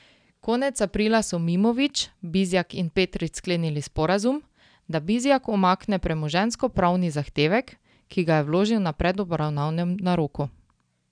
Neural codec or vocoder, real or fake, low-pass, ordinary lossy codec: none; real; 9.9 kHz; none